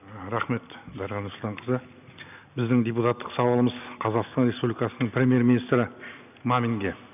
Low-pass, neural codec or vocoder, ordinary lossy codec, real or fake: 3.6 kHz; none; none; real